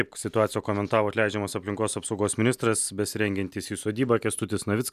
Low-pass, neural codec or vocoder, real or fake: 14.4 kHz; none; real